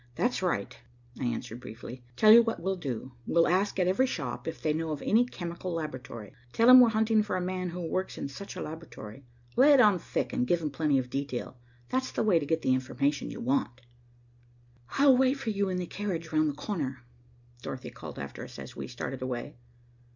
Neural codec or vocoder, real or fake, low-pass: none; real; 7.2 kHz